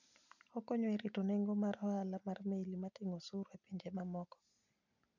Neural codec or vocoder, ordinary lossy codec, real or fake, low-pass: none; none; real; 7.2 kHz